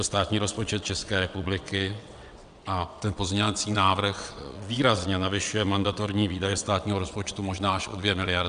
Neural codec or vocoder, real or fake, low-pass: vocoder, 22.05 kHz, 80 mel bands, WaveNeXt; fake; 9.9 kHz